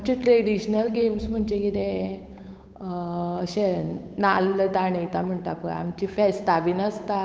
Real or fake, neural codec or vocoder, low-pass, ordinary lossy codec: fake; codec, 16 kHz, 8 kbps, FunCodec, trained on Chinese and English, 25 frames a second; none; none